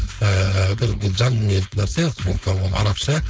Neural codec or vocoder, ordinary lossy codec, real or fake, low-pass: codec, 16 kHz, 4.8 kbps, FACodec; none; fake; none